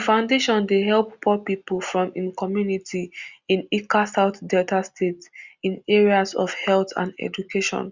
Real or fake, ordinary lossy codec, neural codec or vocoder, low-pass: real; Opus, 64 kbps; none; 7.2 kHz